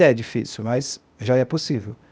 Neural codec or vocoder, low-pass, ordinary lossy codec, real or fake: codec, 16 kHz, 0.8 kbps, ZipCodec; none; none; fake